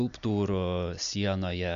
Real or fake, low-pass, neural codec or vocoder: real; 7.2 kHz; none